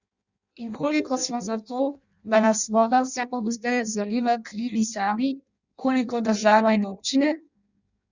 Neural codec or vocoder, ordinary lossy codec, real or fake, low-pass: codec, 16 kHz in and 24 kHz out, 0.6 kbps, FireRedTTS-2 codec; Opus, 64 kbps; fake; 7.2 kHz